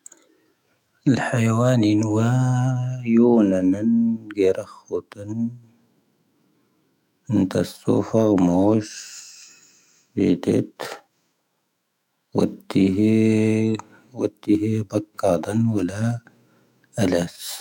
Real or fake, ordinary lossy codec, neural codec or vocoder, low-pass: fake; none; autoencoder, 48 kHz, 128 numbers a frame, DAC-VAE, trained on Japanese speech; 19.8 kHz